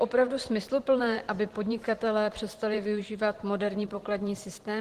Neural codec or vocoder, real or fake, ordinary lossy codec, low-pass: vocoder, 44.1 kHz, 128 mel bands every 512 samples, BigVGAN v2; fake; Opus, 16 kbps; 14.4 kHz